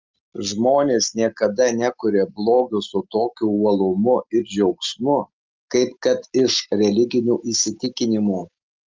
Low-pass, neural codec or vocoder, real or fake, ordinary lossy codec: 7.2 kHz; none; real; Opus, 32 kbps